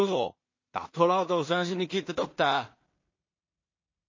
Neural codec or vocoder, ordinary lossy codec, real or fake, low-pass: codec, 16 kHz in and 24 kHz out, 0.4 kbps, LongCat-Audio-Codec, two codebook decoder; MP3, 32 kbps; fake; 7.2 kHz